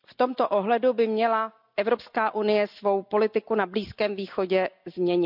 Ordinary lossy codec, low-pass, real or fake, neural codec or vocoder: none; 5.4 kHz; real; none